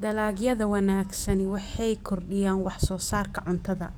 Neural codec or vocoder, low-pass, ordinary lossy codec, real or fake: codec, 44.1 kHz, 7.8 kbps, DAC; none; none; fake